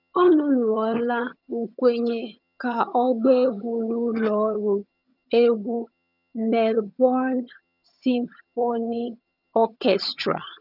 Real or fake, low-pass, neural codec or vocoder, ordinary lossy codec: fake; 5.4 kHz; vocoder, 22.05 kHz, 80 mel bands, HiFi-GAN; none